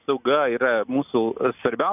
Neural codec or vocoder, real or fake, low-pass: none; real; 3.6 kHz